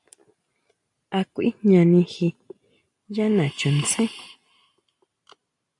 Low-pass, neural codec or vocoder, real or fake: 10.8 kHz; none; real